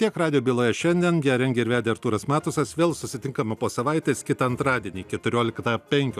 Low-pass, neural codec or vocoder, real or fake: 14.4 kHz; none; real